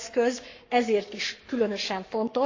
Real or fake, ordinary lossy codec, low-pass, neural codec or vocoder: fake; AAC, 48 kbps; 7.2 kHz; codec, 44.1 kHz, 7.8 kbps, Pupu-Codec